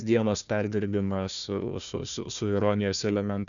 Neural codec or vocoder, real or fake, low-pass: codec, 16 kHz, 1 kbps, FunCodec, trained on Chinese and English, 50 frames a second; fake; 7.2 kHz